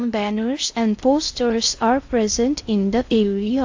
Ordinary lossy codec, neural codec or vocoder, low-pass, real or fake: AAC, 48 kbps; codec, 16 kHz in and 24 kHz out, 0.6 kbps, FocalCodec, streaming, 2048 codes; 7.2 kHz; fake